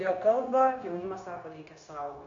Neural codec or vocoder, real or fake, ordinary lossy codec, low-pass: codec, 16 kHz, 0.9 kbps, LongCat-Audio-Codec; fake; AAC, 48 kbps; 7.2 kHz